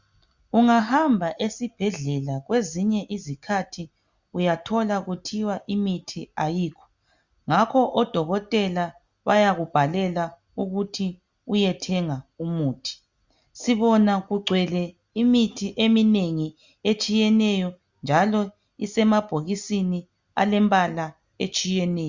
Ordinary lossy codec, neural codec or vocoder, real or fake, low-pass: Opus, 64 kbps; none; real; 7.2 kHz